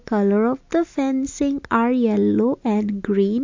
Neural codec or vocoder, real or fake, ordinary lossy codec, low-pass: none; real; MP3, 48 kbps; 7.2 kHz